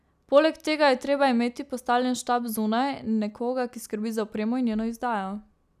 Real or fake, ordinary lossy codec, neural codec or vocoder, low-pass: real; none; none; 14.4 kHz